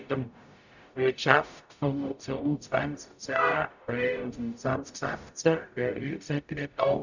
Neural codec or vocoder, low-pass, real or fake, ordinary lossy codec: codec, 44.1 kHz, 0.9 kbps, DAC; 7.2 kHz; fake; none